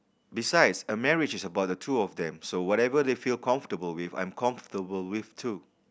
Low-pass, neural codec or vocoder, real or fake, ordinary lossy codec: none; none; real; none